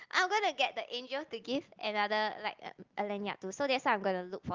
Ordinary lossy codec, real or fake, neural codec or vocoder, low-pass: Opus, 32 kbps; real; none; 7.2 kHz